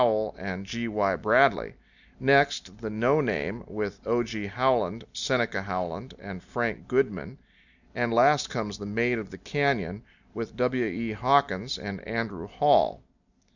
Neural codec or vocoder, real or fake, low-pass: none; real; 7.2 kHz